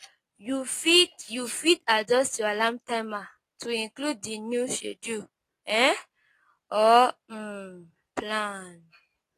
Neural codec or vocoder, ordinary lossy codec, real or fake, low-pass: none; AAC, 48 kbps; real; 14.4 kHz